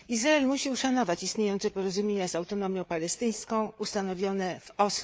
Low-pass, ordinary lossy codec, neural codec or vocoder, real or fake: none; none; codec, 16 kHz, 4 kbps, FreqCodec, larger model; fake